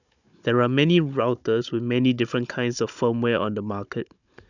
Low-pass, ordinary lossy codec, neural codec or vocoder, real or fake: 7.2 kHz; none; codec, 16 kHz, 16 kbps, FunCodec, trained on Chinese and English, 50 frames a second; fake